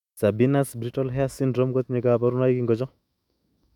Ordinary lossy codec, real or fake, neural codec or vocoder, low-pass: none; fake; autoencoder, 48 kHz, 128 numbers a frame, DAC-VAE, trained on Japanese speech; 19.8 kHz